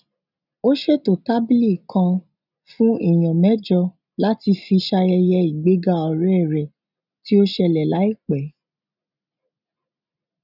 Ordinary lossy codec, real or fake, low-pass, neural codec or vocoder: none; real; 5.4 kHz; none